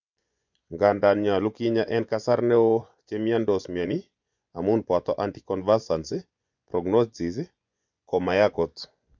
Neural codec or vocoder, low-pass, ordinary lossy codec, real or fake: vocoder, 24 kHz, 100 mel bands, Vocos; 7.2 kHz; none; fake